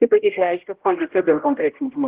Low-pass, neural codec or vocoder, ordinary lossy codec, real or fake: 3.6 kHz; codec, 16 kHz, 0.5 kbps, X-Codec, HuBERT features, trained on general audio; Opus, 16 kbps; fake